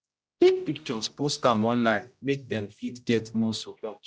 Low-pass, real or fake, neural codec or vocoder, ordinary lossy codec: none; fake; codec, 16 kHz, 0.5 kbps, X-Codec, HuBERT features, trained on general audio; none